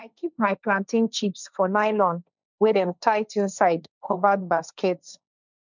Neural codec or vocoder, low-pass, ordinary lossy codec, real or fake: codec, 16 kHz, 1.1 kbps, Voila-Tokenizer; 7.2 kHz; none; fake